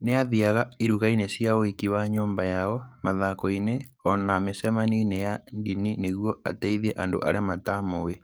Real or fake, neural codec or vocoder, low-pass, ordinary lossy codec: fake; codec, 44.1 kHz, 7.8 kbps, DAC; none; none